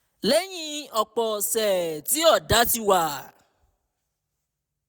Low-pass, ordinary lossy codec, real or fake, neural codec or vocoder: none; none; real; none